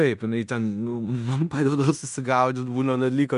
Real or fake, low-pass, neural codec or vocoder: fake; 10.8 kHz; codec, 16 kHz in and 24 kHz out, 0.9 kbps, LongCat-Audio-Codec, fine tuned four codebook decoder